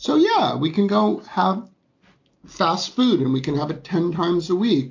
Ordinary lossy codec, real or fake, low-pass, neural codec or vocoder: AAC, 48 kbps; real; 7.2 kHz; none